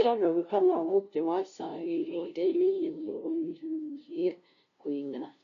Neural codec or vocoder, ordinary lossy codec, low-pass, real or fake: codec, 16 kHz, 0.5 kbps, FunCodec, trained on LibriTTS, 25 frames a second; none; 7.2 kHz; fake